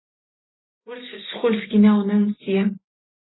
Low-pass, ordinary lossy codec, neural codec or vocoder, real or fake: 7.2 kHz; AAC, 16 kbps; none; real